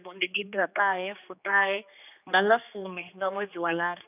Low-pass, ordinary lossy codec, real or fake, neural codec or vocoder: 3.6 kHz; none; fake; codec, 16 kHz, 2 kbps, X-Codec, HuBERT features, trained on general audio